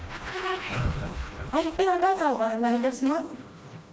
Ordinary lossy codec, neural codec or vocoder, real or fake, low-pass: none; codec, 16 kHz, 1 kbps, FreqCodec, smaller model; fake; none